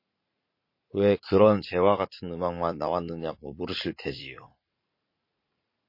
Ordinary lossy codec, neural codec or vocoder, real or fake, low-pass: MP3, 32 kbps; vocoder, 44.1 kHz, 80 mel bands, Vocos; fake; 5.4 kHz